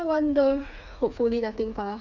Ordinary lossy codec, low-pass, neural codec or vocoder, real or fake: none; 7.2 kHz; codec, 16 kHz, 4 kbps, FreqCodec, smaller model; fake